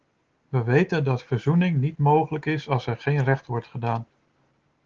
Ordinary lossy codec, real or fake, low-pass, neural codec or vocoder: Opus, 16 kbps; real; 7.2 kHz; none